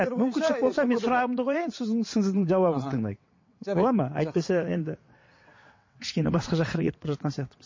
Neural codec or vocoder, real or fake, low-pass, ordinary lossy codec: vocoder, 22.05 kHz, 80 mel bands, WaveNeXt; fake; 7.2 kHz; MP3, 32 kbps